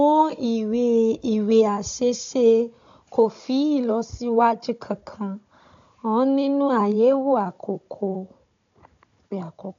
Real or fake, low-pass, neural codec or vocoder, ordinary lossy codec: fake; 7.2 kHz; codec, 16 kHz, 16 kbps, FunCodec, trained on Chinese and English, 50 frames a second; MP3, 64 kbps